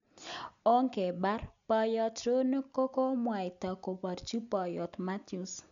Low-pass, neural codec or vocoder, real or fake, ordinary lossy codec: 7.2 kHz; none; real; none